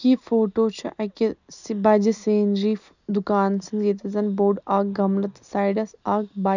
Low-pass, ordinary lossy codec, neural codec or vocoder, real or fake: 7.2 kHz; none; none; real